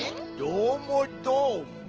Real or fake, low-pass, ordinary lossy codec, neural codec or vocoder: real; 7.2 kHz; Opus, 16 kbps; none